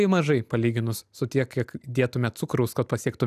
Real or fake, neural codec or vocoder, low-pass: real; none; 14.4 kHz